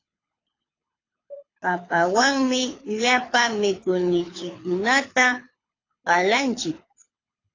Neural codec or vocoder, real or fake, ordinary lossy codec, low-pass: codec, 24 kHz, 6 kbps, HILCodec; fake; AAC, 32 kbps; 7.2 kHz